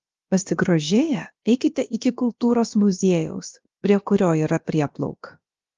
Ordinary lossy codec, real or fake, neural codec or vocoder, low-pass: Opus, 32 kbps; fake; codec, 16 kHz, about 1 kbps, DyCAST, with the encoder's durations; 7.2 kHz